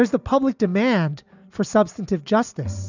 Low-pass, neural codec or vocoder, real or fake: 7.2 kHz; none; real